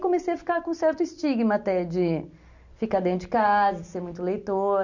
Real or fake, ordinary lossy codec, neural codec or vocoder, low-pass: real; none; none; 7.2 kHz